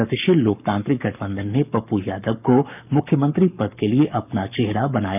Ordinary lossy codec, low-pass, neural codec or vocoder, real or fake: none; 3.6 kHz; codec, 44.1 kHz, 7.8 kbps, Pupu-Codec; fake